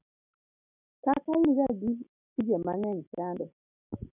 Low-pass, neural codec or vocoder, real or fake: 3.6 kHz; none; real